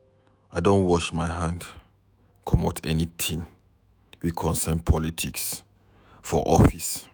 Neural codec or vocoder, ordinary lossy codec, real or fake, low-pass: autoencoder, 48 kHz, 128 numbers a frame, DAC-VAE, trained on Japanese speech; none; fake; none